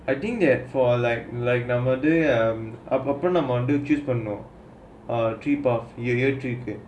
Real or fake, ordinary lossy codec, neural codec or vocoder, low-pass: real; none; none; none